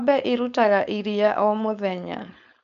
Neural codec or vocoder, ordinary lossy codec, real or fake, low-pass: codec, 16 kHz, 4.8 kbps, FACodec; MP3, 96 kbps; fake; 7.2 kHz